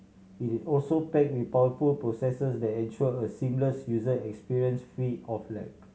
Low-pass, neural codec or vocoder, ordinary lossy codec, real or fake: none; none; none; real